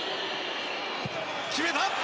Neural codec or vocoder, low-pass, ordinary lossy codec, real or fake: none; none; none; real